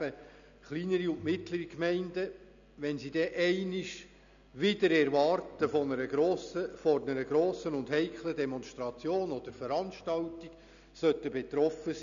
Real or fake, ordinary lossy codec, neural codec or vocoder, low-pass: real; none; none; 7.2 kHz